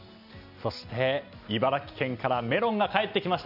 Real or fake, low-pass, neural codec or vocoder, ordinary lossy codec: real; 5.4 kHz; none; none